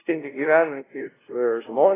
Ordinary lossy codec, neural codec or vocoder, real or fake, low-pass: AAC, 16 kbps; codec, 16 kHz, 0.5 kbps, FunCodec, trained on LibriTTS, 25 frames a second; fake; 3.6 kHz